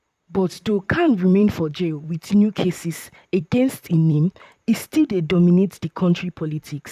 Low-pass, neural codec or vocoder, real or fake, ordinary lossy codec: 14.4 kHz; vocoder, 44.1 kHz, 128 mel bands, Pupu-Vocoder; fake; none